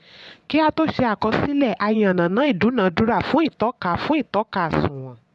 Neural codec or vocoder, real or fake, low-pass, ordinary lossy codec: vocoder, 24 kHz, 100 mel bands, Vocos; fake; none; none